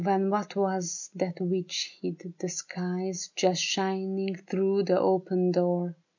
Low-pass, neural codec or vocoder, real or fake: 7.2 kHz; none; real